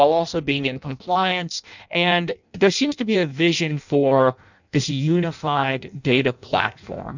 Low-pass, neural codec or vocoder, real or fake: 7.2 kHz; codec, 16 kHz in and 24 kHz out, 0.6 kbps, FireRedTTS-2 codec; fake